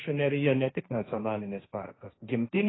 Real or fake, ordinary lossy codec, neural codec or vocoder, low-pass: fake; AAC, 16 kbps; codec, 16 kHz, 1.1 kbps, Voila-Tokenizer; 7.2 kHz